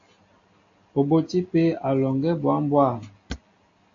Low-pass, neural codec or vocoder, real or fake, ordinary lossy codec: 7.2 kHz; none; real; AAC, 48 kbps